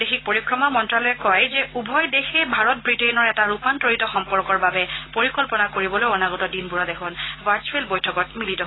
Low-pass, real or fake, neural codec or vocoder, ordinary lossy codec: 7.2 kHz; real; none; AAC, 16 kbps